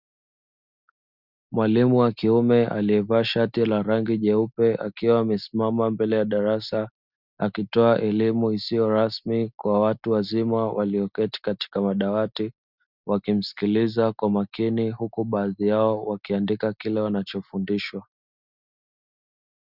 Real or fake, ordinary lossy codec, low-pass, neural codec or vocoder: real; Opus, 64 kbps; 5.4 kHz; none